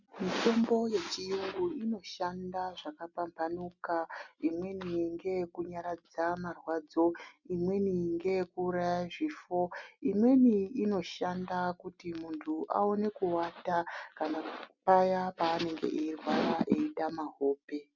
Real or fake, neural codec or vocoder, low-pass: real; none; 7.2 kHz